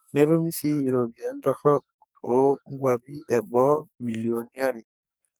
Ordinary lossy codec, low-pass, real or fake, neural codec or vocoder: none; none; fake; codec, 44.1 kHz, 2.6 kbps, SNAC